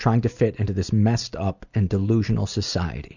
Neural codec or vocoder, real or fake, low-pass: none; real; 7.2 kHz